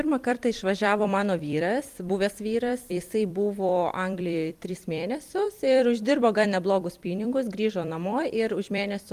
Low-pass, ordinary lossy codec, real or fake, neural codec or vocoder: 14.4 kHz; Opus, 32 kbps; fake; vocoder, 44.1 kHz, 128 mel bands every 256 samples, BigVGAN v2